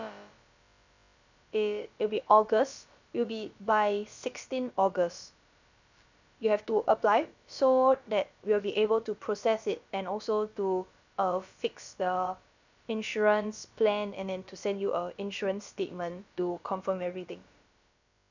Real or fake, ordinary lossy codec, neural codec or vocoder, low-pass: fake; none; codec, 16 kHz, about 1 kbps, DyCAST, with the encoder's durations; 7.2 kHz